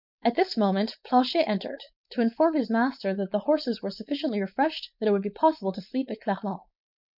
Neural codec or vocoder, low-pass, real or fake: codec, 16 kHz, 4.8 kbps, FACodec; 5.4 kHz; fake